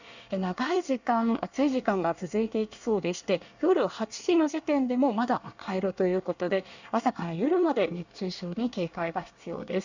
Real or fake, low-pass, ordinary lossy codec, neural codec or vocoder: fake; 7.2 kHz; none; codec, 24 kHz, 1 kbps, SNAC